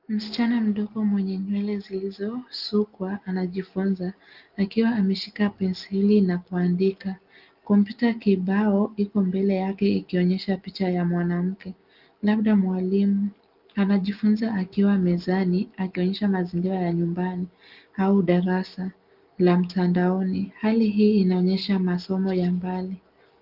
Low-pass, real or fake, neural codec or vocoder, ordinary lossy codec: 5.4 kHz; real; none; Opus, 32 kbps